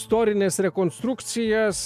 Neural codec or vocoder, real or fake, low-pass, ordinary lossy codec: none; real; 14.4 kHz; AAC, 96 kbps